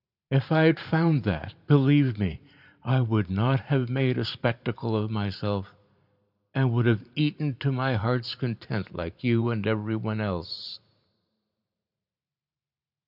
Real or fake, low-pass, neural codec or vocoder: fake; 5.4 kHz; vocoder, 44.1 kHz, 128 mel bands every 512 samples, BigVGAN v2